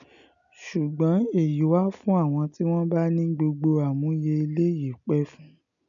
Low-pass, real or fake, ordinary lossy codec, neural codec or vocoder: 7.2 kHz; real; none; none